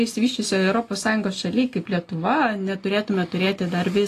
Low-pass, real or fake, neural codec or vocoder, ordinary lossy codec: 14.4 kHz; real; none; AAC, 48 kbps